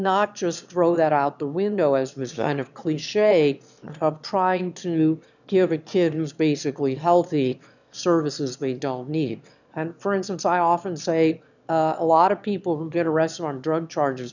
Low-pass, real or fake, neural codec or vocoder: 7.2 kHz; fake; autoencoder, 22.05 kHz, a latent of 192 numbers a frame, VITS, trained on one speaker